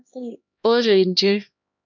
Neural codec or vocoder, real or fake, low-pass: codec, 16 kHz, 1 kbps, X-Codec, HuBERT features, trained on LibriSpeech; fake; 7.2 kHz